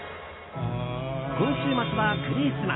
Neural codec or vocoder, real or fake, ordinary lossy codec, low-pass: none; real; AAC, 16 kbps; 7.2 kHz